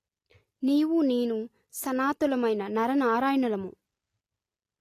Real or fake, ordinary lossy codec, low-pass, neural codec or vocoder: real; AAC, 48 kbps; 14.4 kHz; none